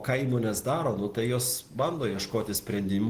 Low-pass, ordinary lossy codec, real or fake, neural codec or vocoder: 14.4 kHz; Opus, 16 kbps; real; none